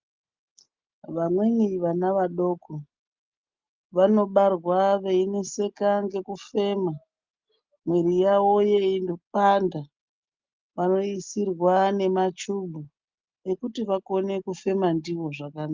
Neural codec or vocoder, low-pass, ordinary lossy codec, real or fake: none; 7.2 kHz; Opus, 24 kbps; real